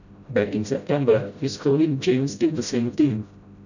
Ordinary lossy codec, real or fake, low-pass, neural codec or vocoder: none; fake; 7.2 kHz; codec, 16 kHz, 0.5 kbps, FreqCodec, smaller model